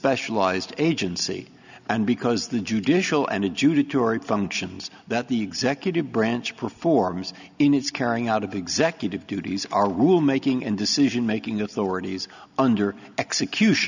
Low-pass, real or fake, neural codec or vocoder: 7.2 kHz; real; none